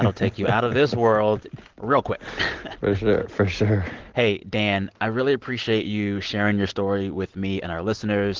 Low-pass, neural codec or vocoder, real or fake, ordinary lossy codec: 7.2 kHz; none; real; Opus, 16 kbps